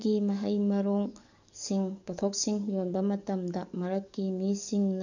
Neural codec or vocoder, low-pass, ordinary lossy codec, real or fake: codec, 44.1 kHz, 7.8 kbps, DAC; 7.2 kHz; none; fake